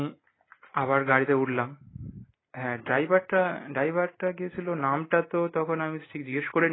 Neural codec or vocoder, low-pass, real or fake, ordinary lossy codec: none; 7.2 kHz; real; AAC, 16 kbps